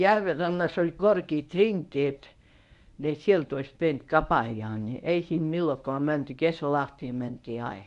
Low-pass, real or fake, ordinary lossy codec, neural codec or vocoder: 10.8 kHz; fake; none; codec, 24 kHz, 0.9 kbps, WavTokenizer, medium speech release version 1